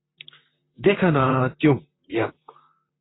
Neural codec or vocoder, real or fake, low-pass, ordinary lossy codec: vocoder, 44.1 kHz, 128 mel bands, Pupu-Vocoder; fake; 7.2 kHz; AAC, 16 kbps